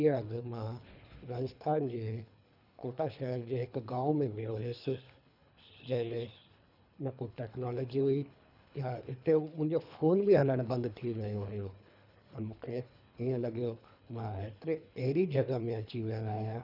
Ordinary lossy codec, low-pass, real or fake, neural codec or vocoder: none; 5.4 kHz; fake; codec, 24 kHz, 3 kbps, HILCodec